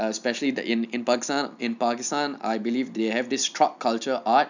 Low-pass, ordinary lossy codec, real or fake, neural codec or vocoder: 7.2 kHz; none; real; none